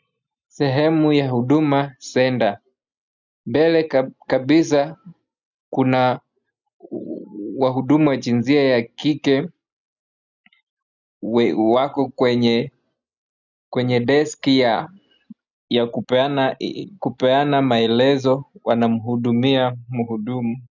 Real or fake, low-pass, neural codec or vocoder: real; 7.2 kHz; none